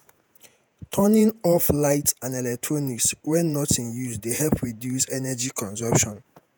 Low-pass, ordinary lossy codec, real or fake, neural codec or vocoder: none; none; fake; vocoder, 48 kHz, 128 mel bands, Vocos